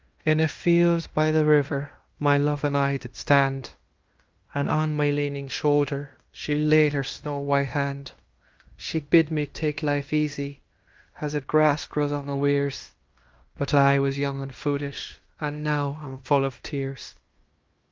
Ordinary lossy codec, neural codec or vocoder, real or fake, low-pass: Opus, 32 kbps; codec, 16 kHz in and 24 kHz out, 0.9 kbps, LongCat-Audio-Codec, fine tuned four codebook decoder; fake; 7.2 kHz